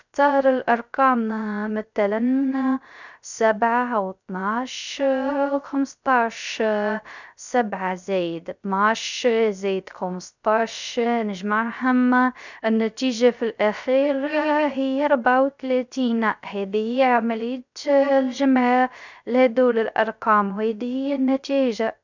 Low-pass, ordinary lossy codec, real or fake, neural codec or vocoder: 7.2 kHz; none; fake; codec, 16 kHz, 0.3 kbps, FocalCodec